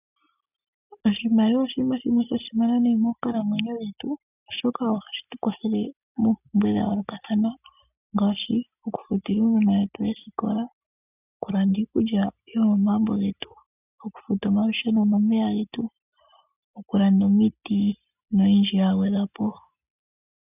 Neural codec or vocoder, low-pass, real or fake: none; 3.6 kHz; real